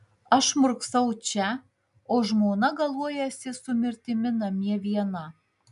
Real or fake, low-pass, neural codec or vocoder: real; 10.8 kHz; none